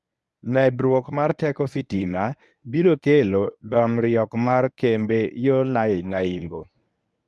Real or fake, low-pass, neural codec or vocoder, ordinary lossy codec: fake; none; codec, 24 kHz, 0.9 kbps, WavTokenizer, medium speech release version 1; none